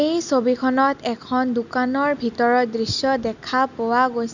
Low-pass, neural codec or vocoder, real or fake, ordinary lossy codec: 7.2 kHz; none; real; none